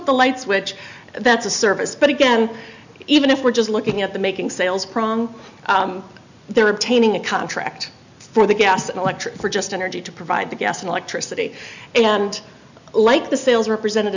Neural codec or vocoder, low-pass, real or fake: none; 7.2 kHz; real